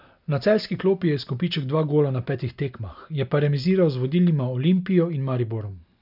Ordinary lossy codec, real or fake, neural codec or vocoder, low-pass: none; real; none; 5.4 kHz